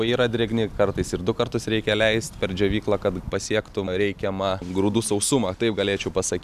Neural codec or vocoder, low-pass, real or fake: none; 14.4 kHz; real